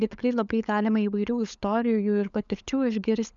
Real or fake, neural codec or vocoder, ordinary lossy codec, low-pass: fake; codec, 16 kHz, 16 kbps, FunCodec, trained on LibriTTS, 50 frames a second; MP3, 96 kbps; 7.2 kHz